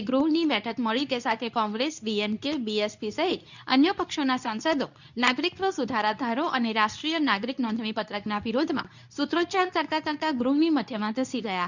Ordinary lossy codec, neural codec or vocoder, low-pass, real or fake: none; codec, 24 kHz, 0.9 kbps, WavTokenizer, medium speech release version 2; 7.2 kHz; fake